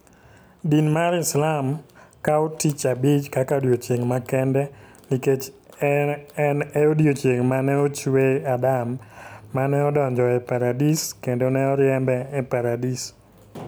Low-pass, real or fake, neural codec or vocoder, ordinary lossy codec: none; real; none; none